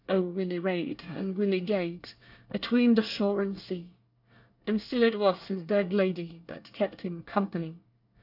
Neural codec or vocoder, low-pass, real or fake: codec, 24 kHz, 1 kbps, SNAC; 5.4 kHz; fake